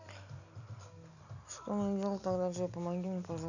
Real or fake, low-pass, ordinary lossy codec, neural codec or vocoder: real; 7.2 kHz; none; none